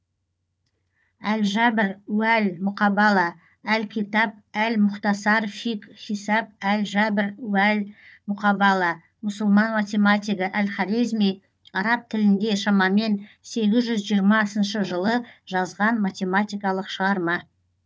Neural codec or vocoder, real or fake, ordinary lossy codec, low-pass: codec, 16 kHz, 4 kbps, FunCodec, trained on Chinese and English, 50 frames a second; fake; none; none